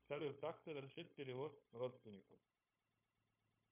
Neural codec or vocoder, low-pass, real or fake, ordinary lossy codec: codec, 16 kHz, 0.9 kbps, LongCat-Audio-Codec; 3.6 kHz; fake; MP3, 32 kbps